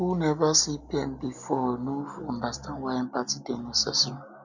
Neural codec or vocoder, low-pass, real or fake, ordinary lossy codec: none; 7.2 kHz; real; none